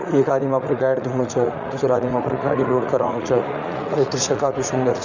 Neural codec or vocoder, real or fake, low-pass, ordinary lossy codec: vocoder, 44.1 kHz, 80 mel bands, Vocos; fake; 7.2 kHz; Opus, 64 kbps